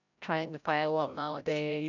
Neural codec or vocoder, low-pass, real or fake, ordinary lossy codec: codec, 16 kHz, 0.5 kbps, FreqCodec, larger model; 7.2 kHz; fake; none